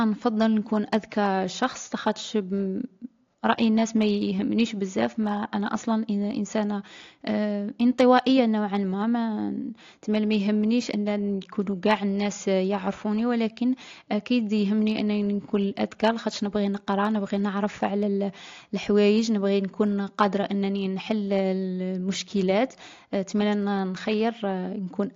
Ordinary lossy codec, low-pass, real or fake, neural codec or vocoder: AAC, 48 kbps; 7.2 kHz; real; none